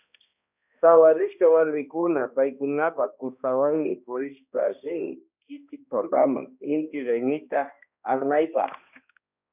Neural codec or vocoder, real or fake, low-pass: codec, 16 kHz, 1 kbps, X-Codec, HuBERT features, trained on balanced general audio; fake; 3.6 kHz